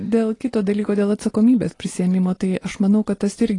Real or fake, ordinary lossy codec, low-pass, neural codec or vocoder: real; AAC, 32 kbps; 10.8 kHz; none